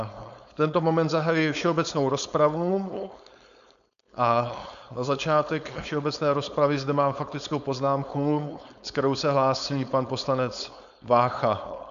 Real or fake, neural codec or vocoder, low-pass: fake; codec, 16 kHz, 4.8 kbps, FACodec; 7.2 kHz